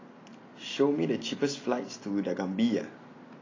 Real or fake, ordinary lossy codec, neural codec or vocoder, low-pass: real; AAC, 32 kbps; none; 7.2 kHz